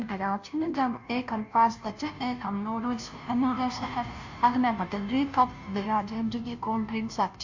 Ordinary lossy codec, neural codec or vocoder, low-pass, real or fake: AAC, 48 kbps; codec, 16 kHz, 0.5 kbps, FunCodec, trained on Chinese and English, 25 frames a second; 7.2 kHz; fake